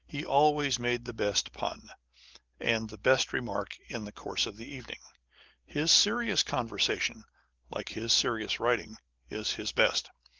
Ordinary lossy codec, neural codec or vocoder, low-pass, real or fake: Opus, 16 kbps; none; 7.2 kHz; real